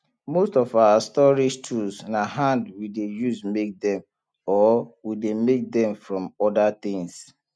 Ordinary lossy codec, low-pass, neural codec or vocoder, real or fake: none; none; none; real